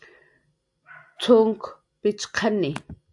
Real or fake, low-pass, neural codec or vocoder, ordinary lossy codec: real; 10.8 kHz; none; AAC, 64 kbps